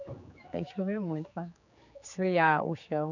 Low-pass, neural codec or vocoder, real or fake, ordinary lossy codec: 7.2 kHz; codec, 16 kHz, 4 kbps, X-Codec, HuBERT features, trained on general audio; fake; none